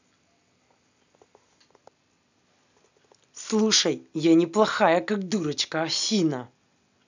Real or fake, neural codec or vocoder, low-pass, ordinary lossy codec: real; none; 7.2 kHz; none